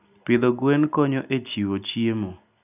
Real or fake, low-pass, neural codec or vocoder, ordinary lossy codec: real; 3.6 kHz; none; none